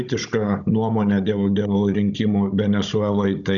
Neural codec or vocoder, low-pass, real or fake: codec, 16 kHz, 16 kbps, FunCodec, trained on Chinese and English, 50 frames a second; 7.2 kHz; fake